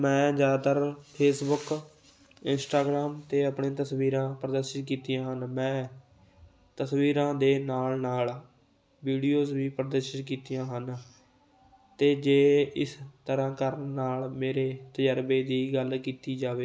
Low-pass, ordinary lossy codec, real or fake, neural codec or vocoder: none; none; real; none